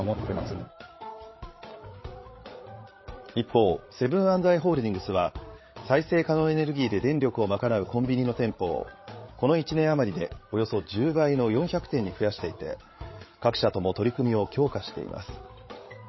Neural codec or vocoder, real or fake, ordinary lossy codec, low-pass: codec, 16 kHz, 8 kbps, FreqCodec, larger model; fake; MP3, 24 kbps; 7.2 kHz